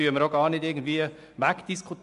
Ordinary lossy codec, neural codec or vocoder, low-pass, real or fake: AAC, 64 kbps; none; 10.8 kHz; real